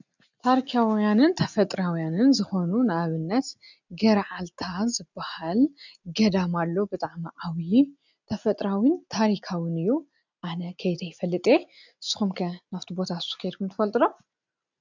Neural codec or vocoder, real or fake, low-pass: none; real; 7.2 kHz